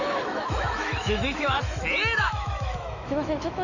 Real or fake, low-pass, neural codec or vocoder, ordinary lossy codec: fake; 7.2 kHz; autoencoder, 48 kHz, 128 numbers a frame, DAC-VAE, trained on Japanese speech; none